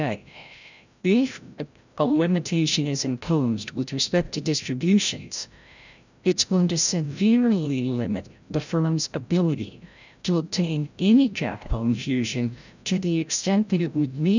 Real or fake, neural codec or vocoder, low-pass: fake; codec, 16 kHz, 0.5 kbps, FreqCodec, larger model; 7.2 kHz